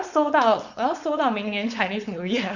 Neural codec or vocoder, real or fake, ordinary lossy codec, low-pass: codec, 16 kHz, 4.8 kbps, FACodec; fake; Opus, 64 kbps; 7.2 kHz